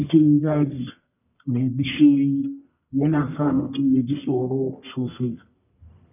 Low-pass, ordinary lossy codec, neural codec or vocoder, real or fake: 3.6 kHz; none; codec, 44.1 kHz, 1.7 kbps, Pupu-Codec; fake